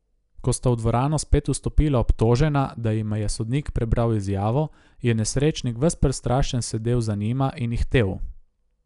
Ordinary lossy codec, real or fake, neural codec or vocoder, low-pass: none; real; none; 10.8 kHz